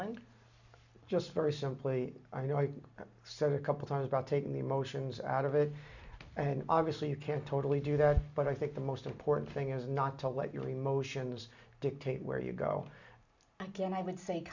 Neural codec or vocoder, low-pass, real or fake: none; 7.2 kHz; real